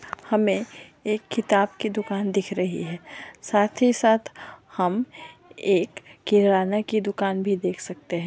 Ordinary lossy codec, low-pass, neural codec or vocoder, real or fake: none; none; none; real